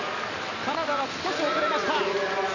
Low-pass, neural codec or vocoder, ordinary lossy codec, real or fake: 7.2 kHz; none; none; real